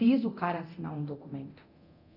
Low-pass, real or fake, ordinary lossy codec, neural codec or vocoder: 5.4 kHz; fake; none; codec, 24 kHz, 0.9 kbps, DualCodec